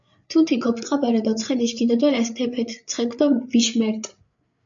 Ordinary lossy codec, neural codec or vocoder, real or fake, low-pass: MP3, 64 kbps; codec, 16 kHz, 8 kbps, FreqCodec, larger model; fake; 7.2 kHz